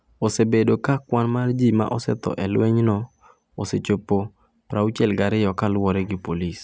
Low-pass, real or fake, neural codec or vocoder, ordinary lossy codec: none; real; none; none